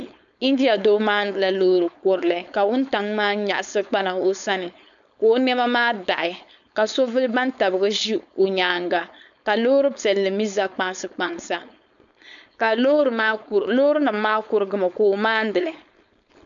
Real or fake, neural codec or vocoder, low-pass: fake; codec, 16 kHz, 4.8 kbps, FACodec; 7.2 kHz